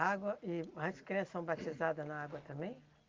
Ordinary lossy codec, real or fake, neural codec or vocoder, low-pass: Opus, 24 kbps; real; none; 7.2 kHz